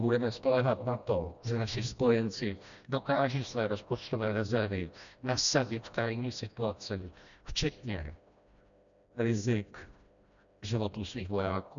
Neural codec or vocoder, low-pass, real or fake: codec, 16 kHz, 1 kbps, FreqCodec, smaller model; 7.2 kHz; fake